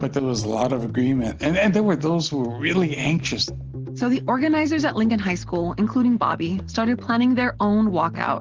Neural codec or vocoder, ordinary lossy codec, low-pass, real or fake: none; Opus, 16 kbps; 7.2 kHz; real